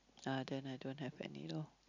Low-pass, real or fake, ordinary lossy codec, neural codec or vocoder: 7.2 kHz; real; none; none